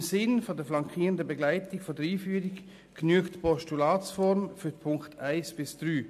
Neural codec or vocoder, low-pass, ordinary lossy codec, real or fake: none; 14.4 kHz; AAC, 96 kbps; real